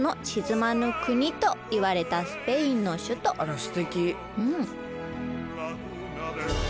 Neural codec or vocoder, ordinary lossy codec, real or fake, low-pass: none; none; real; none